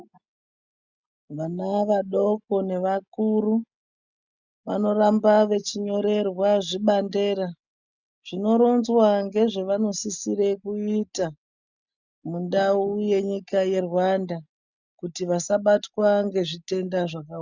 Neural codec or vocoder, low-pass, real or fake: none; 7.2 kHz; real